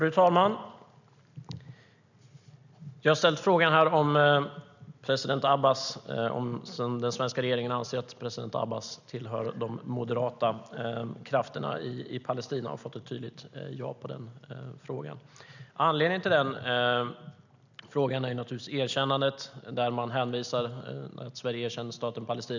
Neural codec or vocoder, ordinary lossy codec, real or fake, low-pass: none; none; real; 7.2 kHz